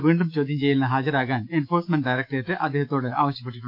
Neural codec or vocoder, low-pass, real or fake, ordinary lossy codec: autoencoder, 48 kHz, 128 numbers a frame, DAC-VAE, trained on Japanese speech; 5.4 kHz; fake; AAC, 48 kbps